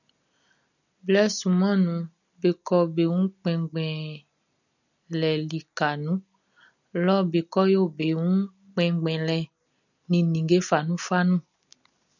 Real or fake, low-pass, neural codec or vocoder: real; 7.2 kHz; none